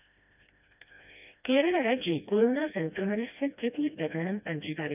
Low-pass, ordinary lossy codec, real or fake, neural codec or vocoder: 3.6 kHz; none; fake; codec, 16 kHz, 1 kbps, FreqCodec, smaller model